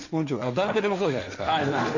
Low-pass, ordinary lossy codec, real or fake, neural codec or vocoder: 7.2 kHz; none; fake; codec, 16 kHz, 1.1 kbps, Voila-Tokenizer